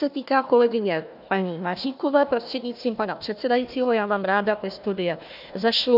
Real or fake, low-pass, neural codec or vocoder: fake; 5.4 kHz; codec, 16 kHz, 1 kbps, FunCodec, trained on Chinese and English, 50 frames a second